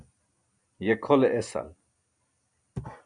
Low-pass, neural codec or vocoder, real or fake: 9.9 kHz; none; real